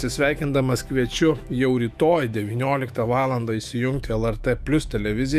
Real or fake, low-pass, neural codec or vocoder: fake; 14.4 kHz; autoencoder, 48 kHz, 128 numbers a frame, DAC-VAE, trained on Japanese speech